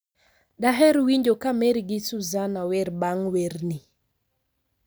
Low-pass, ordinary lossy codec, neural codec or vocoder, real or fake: none; none; none; real